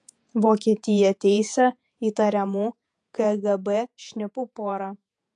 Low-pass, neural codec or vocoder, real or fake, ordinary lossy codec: 10.8 kHz; vocoder, 48 kHz, 128 mel bands, Vocos; fake; AAC, 64 kbps